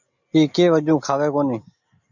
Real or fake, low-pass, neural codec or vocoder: real; 7.2 kHz; none